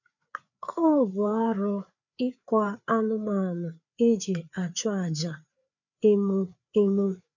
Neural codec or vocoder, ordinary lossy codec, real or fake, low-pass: codec, 16 kHz, 4 kbps, FreqCodec, larger model; none; fake; 7.2 kHz